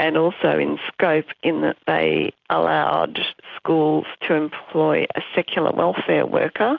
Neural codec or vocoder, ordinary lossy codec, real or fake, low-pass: none; AAC, 48 kbps; real; 7.2 kHz